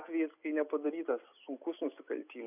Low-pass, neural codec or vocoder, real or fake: 3.6 kHz; none; real